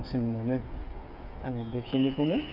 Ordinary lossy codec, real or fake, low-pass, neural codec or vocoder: none; fake; 5.4 kHz; codec, 16 kHz, 8 kbps, FreqCodec, smaller model